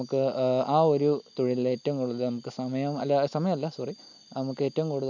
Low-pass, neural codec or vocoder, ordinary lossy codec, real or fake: 7.2 kHz; none; none; real